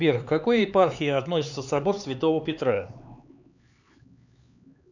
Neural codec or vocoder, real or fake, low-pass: codec, 16 kHz, 4 kbps, X-Codec, HuBERT features, trained on LibriSpeech; fake; 7.2 kHz